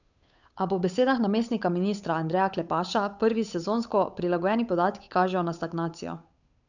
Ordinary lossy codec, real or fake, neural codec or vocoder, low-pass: none; fake; codec, 16 kHz, 8 kbps, FunCodec, trained on Chinese and English, 25 frames a second; 7.2 kHz